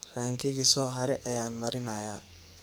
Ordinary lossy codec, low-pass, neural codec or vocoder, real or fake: none; none; codec, 44.1 kHz, 2.6 kbps, SNAC; fake